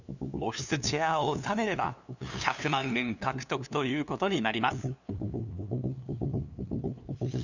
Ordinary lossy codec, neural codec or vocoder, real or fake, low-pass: none; codec, 16 kHz, 2 kbps, FunCodec, trained on LibriTTS, 25 frames a second; fake; 7.2 kHz